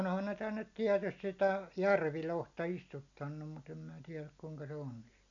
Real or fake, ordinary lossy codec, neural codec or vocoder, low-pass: real; none; none; 7.2 kHz